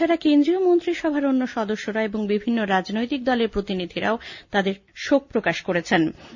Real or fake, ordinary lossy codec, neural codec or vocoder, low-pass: real; Opus, 64 kbps; none; 7.2 kHz